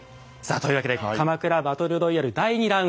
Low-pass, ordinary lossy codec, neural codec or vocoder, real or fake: none; none; none; real